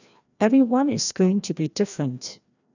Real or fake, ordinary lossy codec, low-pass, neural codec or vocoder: fake; none; 7.2 kHz; codec, 16 kHz, 1 kbps, FreqCodec, larger model